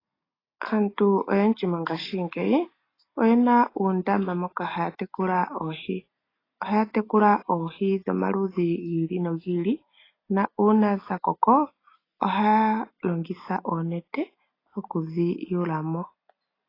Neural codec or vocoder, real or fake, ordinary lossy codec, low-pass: none; real; AAC, 24 kbps; 5.4 kHz